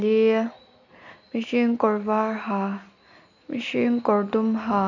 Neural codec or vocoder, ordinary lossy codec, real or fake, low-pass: none; none; real; 7.2 kHz